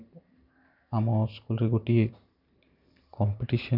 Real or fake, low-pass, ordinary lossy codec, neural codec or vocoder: fake; 5.4 kHz; none; vocoder, 44.1 kHz, 128 mel bands every 512 samples, BigVGAN v2